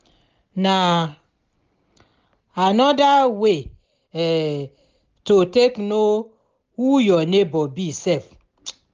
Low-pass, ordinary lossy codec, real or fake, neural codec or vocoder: 7.2 kHz; Opus, 24 kbps; real; none